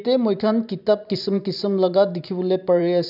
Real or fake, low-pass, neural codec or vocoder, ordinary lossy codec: fake; 5.4 kHz; vocoder, 44.1 kHz, 128 mel bands every 512 samples, BigVGAN v2; none